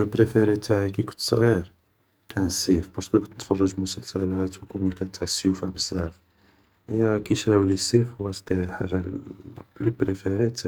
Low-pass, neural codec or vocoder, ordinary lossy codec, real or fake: none; codec, 44.1 kHz, 2.6 kbps, SNAC; none; fake